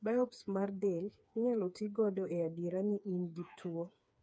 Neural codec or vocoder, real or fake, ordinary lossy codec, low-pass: codec, 16 kHz, 4 kbps, FreqCodec, smaller model; fake; none; none